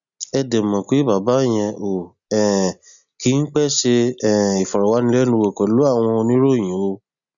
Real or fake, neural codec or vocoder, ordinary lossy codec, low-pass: real; none; none; 7.2 kHz